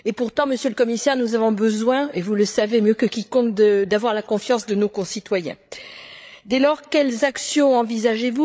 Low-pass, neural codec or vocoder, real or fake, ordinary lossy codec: none; codec, 16 kHz, 8 kbps, FreqCodec, larger model; fake; none